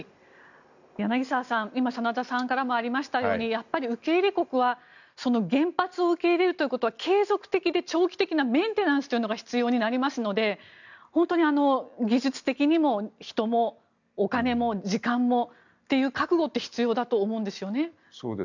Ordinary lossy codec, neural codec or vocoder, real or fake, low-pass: none; none; real; 7.2 kHz